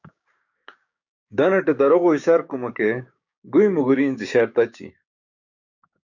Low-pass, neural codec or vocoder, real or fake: 7.2 kHz; codec, 16 kHz, 6 kbps, DAC; fake